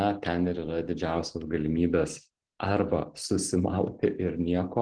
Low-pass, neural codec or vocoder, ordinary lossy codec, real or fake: 9.9 kHz; none; Opus, 16 kbps; real